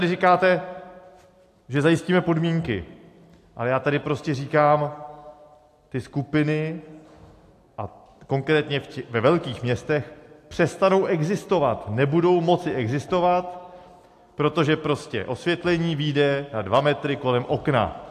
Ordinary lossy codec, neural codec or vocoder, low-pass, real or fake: AAC, 64 kbps; none; 14.4 kHz; real